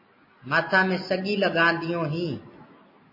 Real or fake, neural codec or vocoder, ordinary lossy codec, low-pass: real; none; MP3, 24 kbps; 5.4 kHz